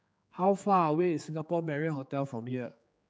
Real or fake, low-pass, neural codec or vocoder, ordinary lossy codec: fake; none; codec, 16 kHz, 4 kbps, X-Codec, HuBERT features, trained on general audio; none